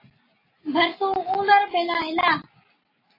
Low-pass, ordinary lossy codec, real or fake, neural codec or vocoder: 5.4 kHz; AAC, 24 kbps; real; none